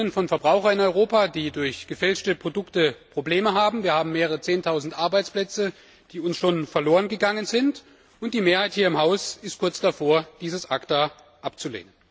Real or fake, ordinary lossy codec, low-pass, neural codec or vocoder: real; none; none; none